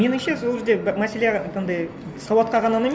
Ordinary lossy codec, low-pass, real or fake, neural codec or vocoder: none; none; real; none